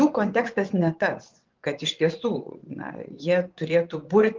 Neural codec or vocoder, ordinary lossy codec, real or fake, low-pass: vocoder, 24 kHz, 100 mel bands, Vocos; Opus, 24 kbps; fake; 7.2 kHz